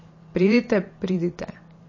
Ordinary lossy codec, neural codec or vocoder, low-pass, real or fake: MP3, 32 kbps; vocoder, 44.1 kHz, 128 mel bands every 512 samples, BigVGAN v2; 7.2 kHz; fake